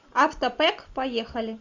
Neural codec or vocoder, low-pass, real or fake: none; 7.2 kHz; real